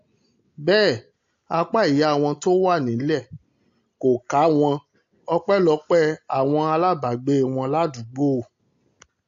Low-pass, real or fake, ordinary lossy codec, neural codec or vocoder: 7.2 kHz; real; AAC, 48 kbps; none